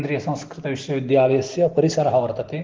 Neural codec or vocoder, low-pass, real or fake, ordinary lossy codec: none; 7.2 kHz; real; Opus, 16 kbps